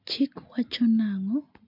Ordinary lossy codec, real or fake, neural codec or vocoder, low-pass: MP3, 48 kbps; real; none; 5.4 kHz